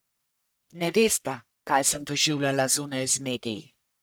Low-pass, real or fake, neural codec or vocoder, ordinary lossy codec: none; fake; codec, 44.1 kHz, 1.7 kbps, Pupu-Codec; none